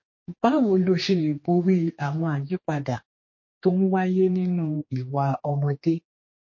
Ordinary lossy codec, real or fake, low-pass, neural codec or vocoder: MP3, 32 kbps; fake; 7.2 kHz; codec, 16 kHz, 2 kbps, X-Codec, HuBERT features, trained on general audio